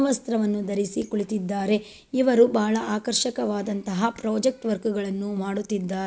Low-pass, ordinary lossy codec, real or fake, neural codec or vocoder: none; none; real; none